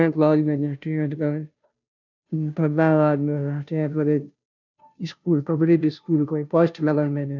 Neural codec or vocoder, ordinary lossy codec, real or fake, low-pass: codec, 16 kHz, 0.5 kbps, FunCodec, trained on Chinese and English, 25 frames a second; none; fake; 7.2 kHz